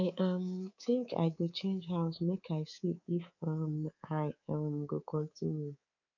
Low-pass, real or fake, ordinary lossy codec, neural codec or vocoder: 7.2 kHz; fake; none; codec, 24 kHz, 3.1 kbps, DualCodec